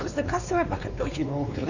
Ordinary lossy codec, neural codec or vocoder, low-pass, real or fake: none; codec, 16 kHz, 2 kbps, X-Codec, WavLM features, trained on Multilingual LibriSpeech; 7.2 kHz; fake